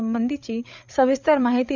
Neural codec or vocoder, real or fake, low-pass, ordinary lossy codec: codec, 16 kHz, 8 kbps, FreqCodec, larger model; fake; 7.2 kHz; none